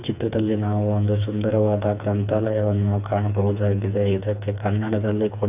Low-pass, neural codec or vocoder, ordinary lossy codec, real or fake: 3.6 kHz; codec, 16 kHz, 4 kbps, FreqCodec, smaller model; none; fake